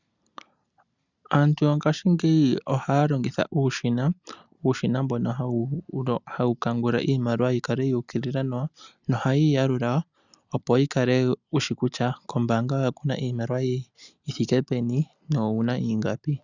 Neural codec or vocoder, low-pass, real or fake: none; 7.2 kHz; real